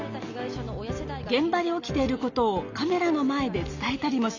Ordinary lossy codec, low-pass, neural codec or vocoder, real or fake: none; 7.2 kHz; none; real